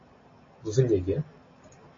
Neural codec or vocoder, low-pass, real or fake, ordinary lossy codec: none; 7.2 kHz; real; AAC, 32 kbps